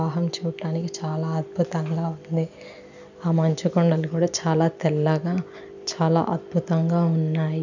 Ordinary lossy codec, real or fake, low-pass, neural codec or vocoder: none; real; 7.2 kHz; none